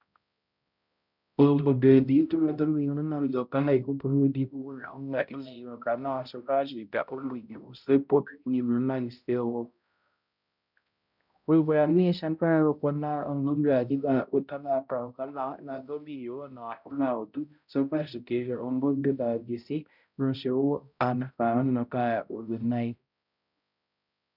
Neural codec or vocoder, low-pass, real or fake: codec, 16 kHz, 0.5 kbps, X-Codec, HuBERT features, trained on balanced general audio; 5.4 kHz; fake